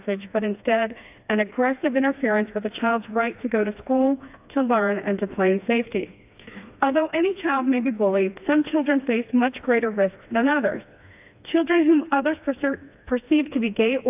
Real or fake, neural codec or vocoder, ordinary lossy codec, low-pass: fake; codec, 16 kHz, 2 kbps, FreqCodec, smaller model; AAC, 32 kbps; 3.6 kHz